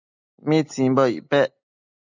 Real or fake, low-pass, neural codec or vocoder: real; 7.2 kHz; none